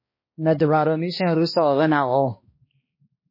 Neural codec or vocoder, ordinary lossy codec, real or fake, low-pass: codec, 16 kHz, 1 kbps, X-Codec, HuBERT features, trained on balanced general audio; MP3, 24 kbps; fake; 5.4 kHz